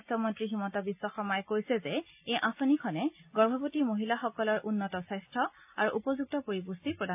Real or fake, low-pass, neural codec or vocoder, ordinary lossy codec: real; 3.6 kHz; none; AAC, 32 kbps